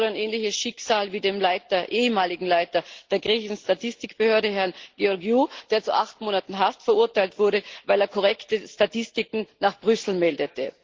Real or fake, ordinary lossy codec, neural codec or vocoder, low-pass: real; Opus, 16 kbps; none; 7.2 kHz